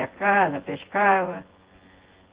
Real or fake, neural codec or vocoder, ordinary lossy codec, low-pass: fake; vocoder, 24 kHz, 100 mel bands, Vocos; Opus, 16 kbps; 3.6 kHz